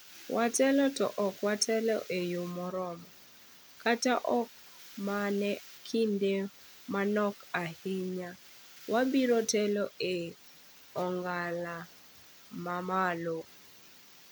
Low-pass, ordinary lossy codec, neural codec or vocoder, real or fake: none; none; none; real